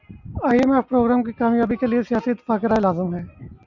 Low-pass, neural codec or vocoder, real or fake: 7.2 kHz; none; real